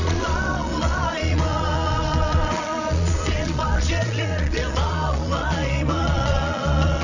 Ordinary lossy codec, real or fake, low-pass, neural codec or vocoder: none; fake; 7.2 kHz; vocoder, 22.05 kHz, 80 mel bands, Vocos